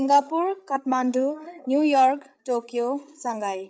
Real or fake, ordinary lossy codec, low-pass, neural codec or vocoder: fake; none; none; codec, 16 kHz, 8 kbps, FreqCodec, larger model